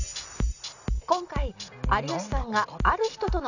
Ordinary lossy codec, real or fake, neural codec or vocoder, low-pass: none; real; none; 7.2 kHz